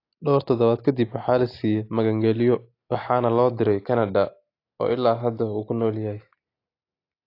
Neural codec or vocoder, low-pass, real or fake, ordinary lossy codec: none; 5.4 kHz; real; AAC, 32 kbps